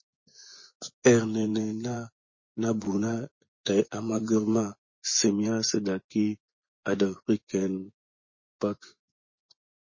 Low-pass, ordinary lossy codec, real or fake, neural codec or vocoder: 7.2 kHz; MP3, 32 kbps; fake; vocoder, 24 kHz, 100 mel bands, Vocos